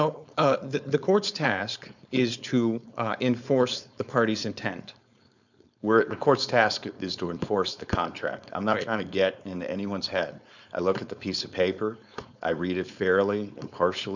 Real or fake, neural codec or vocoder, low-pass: fake; codec, 16 kHz, 4.8 kbps, FACodec; 7.2 kHz